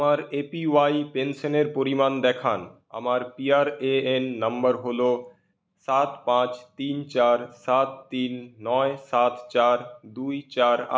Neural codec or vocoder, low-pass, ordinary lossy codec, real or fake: none; none; none; real